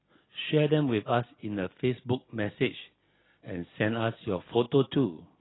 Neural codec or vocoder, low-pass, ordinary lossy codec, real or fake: none; 7.2 kHz; AAC, 16 kbps; real